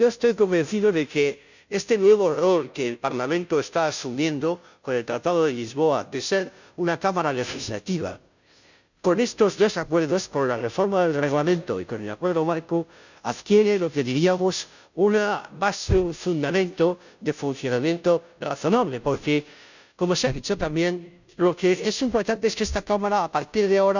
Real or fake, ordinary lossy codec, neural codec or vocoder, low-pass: fake; none; codec, 16 kHz, 0.5 kbps, FunCodec, trained on Chinese and English, 25 frames a second; 7.2 kHz